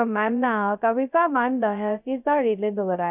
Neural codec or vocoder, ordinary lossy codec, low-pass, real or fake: codec, 16 kHz, 0.2 kbps, FocalCodec; none; 3.6 kHz; fake